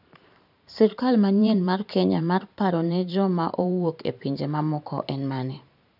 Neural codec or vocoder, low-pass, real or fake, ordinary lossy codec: vocoder, 44.1 kHz, 128 mel bands every 512 samples, BigVGAN v2; 5.4 kHz; fake; none